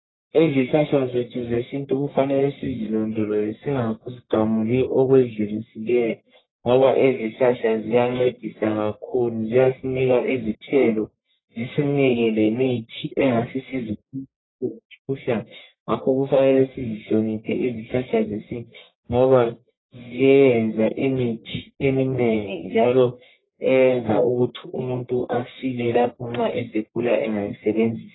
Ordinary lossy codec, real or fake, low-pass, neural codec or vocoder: AAC, 16 kbps; fake; 7.2 kHz; codec, 44.1 kHz, 1.7 kbps, Pupu-Codec